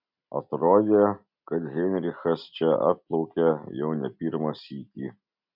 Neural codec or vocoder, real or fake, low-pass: none; real; 5.4 kHz